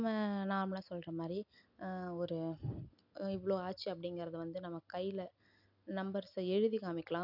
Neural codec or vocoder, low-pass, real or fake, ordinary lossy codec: none; 5.4 kHz; real; none